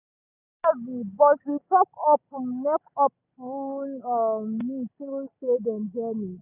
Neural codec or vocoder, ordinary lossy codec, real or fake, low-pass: none; none; real; 3.6 kHz